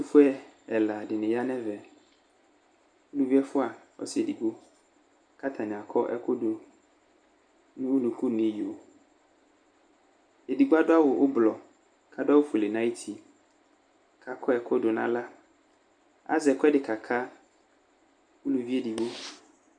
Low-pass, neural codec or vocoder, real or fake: 9.9 kHz; none; real